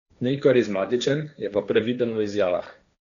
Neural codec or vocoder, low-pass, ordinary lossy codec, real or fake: codec, 16 kHz, 1.1 kbps, Voila-Tokenizer; 7.2 kHz; none; fake